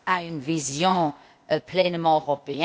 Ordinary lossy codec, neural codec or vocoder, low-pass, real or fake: none; codec, 16 kHz, 0.8 kbps, ZipCodec; none; fake